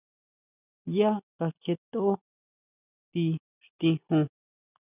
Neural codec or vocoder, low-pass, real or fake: none; 3.6 kHz; real